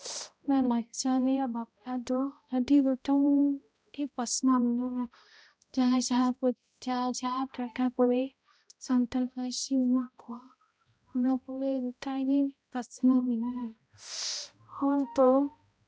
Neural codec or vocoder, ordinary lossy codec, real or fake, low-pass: codec, 16 kHz, 0.5 kbps, X-Codec, HuBERT features, trained on balanced general audio; none; fake; none